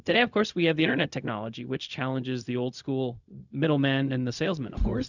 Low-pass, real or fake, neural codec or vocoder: 7.2 kHz; fake; codec, 16 kHz, 0.4 kbps, LongCat-Audio-Codec